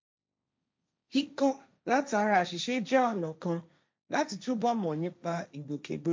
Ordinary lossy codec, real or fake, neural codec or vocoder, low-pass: none; fake; codec, 16 kHz, 1.1 kbps, Voila-Tokenizer; none